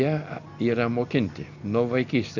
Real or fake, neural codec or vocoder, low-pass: real; none; 7.2 kHz